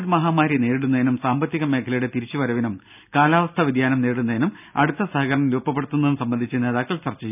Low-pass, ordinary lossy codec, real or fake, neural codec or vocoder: 3.6 kHz; none; real; none